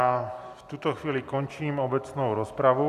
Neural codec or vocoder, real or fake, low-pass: none; real; 14.4 kHz